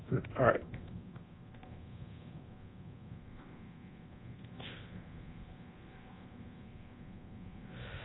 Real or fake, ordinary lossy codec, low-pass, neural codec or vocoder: fake; AAC, 16 kbps; 7.2 kHz; codec, 24 kHz, 0.9 kbps, DualCodec